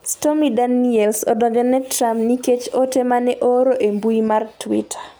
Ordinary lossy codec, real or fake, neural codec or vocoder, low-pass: none; real; none; none